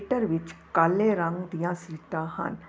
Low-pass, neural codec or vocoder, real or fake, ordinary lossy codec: none; none; real; none